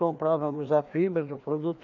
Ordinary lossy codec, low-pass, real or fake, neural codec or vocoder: none; 7.2 kHz; fake; codec, 16 kHz, 2 kbps, FreqCodec, larger model